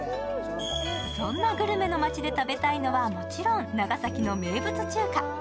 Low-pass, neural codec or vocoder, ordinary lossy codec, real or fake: none; none; none; real